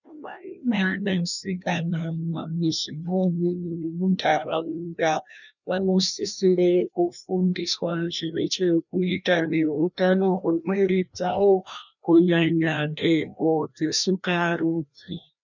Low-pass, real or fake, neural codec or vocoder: 7.2 kHz; fake; codec, 16 kHz, 1 kbps, FreqCodec, larger model